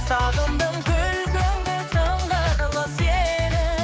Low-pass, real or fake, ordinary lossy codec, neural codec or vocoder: none; fake; none; codec, 16 kHz, 4 kbps, X-Codec, HuBERT features, trained on general audio